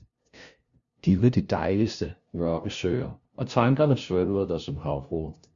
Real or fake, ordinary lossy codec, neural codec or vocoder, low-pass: fake; Opus, 64 kbps; codec, 16 kHz, 0.5 kbps, FunCodec, trained on LibriTTS, 25 frames a second; 7.2 kHz